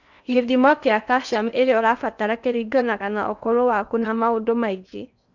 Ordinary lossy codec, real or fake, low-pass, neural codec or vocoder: none; fake; 7.2 kHz; codec, 16 kHz in and 24 kHz out, 0.8 kbps, FocalCodec, streaming, 65536 codes